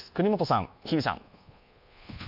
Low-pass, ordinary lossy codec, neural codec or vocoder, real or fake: 5.4 kHz; AAC, 48 kbps; codec, 24 kHz, 1.2 kbps, DualCodec; fake